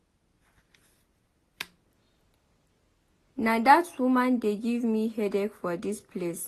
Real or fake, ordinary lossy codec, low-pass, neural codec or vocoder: real; AAC, 48 kbps; 14.4 kHz; none